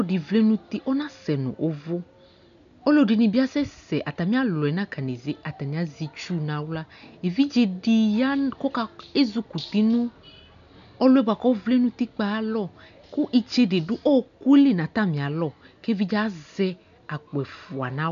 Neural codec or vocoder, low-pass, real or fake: none; 7.2 kHz; real